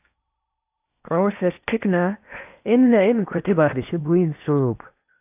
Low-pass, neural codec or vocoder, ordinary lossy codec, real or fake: 3.6 kHz; codec, 16 kHz in and 24 kHz out, 0.8 kbps, FocalCodec, streaming, 65536 codes; AAC, 32 kbps; fake